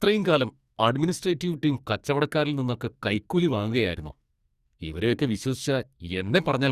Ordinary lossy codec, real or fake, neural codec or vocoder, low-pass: Opus, 64 kbps; fake; codec, 44.1 kHz, 2.6 kbps, SNAC; 14.4 kHz